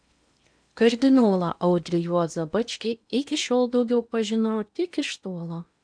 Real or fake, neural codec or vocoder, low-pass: fake; codec, 16 kHz in and 24 kHz out, 0.8 kbps, FocalCodec, streaming, 65536 codes; 9.9 kHz